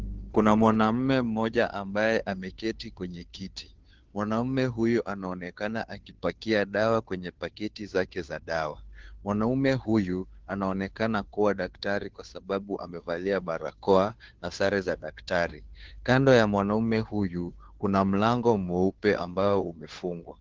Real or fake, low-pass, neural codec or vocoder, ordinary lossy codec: fake; 7.2 kHz; codec, 16 kHz, 2 kbps, FunCodec, trained on Chinese and English, 25 frames a second; Opus, 16 kbps